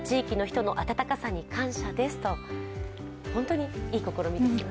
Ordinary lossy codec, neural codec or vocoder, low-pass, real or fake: none; none; none; real